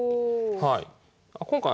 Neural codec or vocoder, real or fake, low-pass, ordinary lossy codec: none; real; none; none